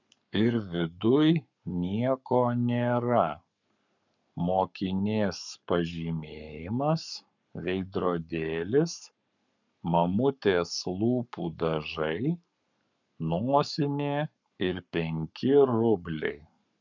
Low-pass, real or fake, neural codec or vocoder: 7.2 kHz; fake; codec, 44.1 kHz, 7.8 kbps, Pupu-Codec